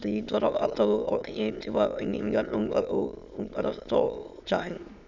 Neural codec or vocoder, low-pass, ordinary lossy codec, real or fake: autoencoder, 22.05 kHz, a latent of 192 numbers a frame, VITS, trained on many speakers; 7.2 kHz; none; fake